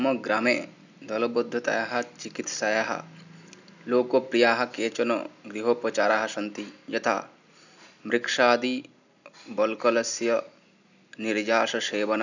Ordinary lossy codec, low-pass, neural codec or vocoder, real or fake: none; 7.2 kHz; none; real